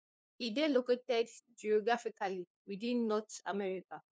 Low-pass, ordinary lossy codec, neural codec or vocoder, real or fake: none; none; codec, 16 kHz, 2 kbps, FunCodec, trained on LibriTTS, 25 frames a second; fake